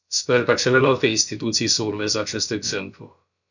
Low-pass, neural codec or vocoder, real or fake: 7.2 kHz; codec, 16 kHz, about 1 kbps, DyCAST, with the encoder's durations; fake